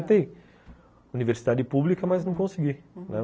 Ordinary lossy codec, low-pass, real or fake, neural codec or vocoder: none; none; real; none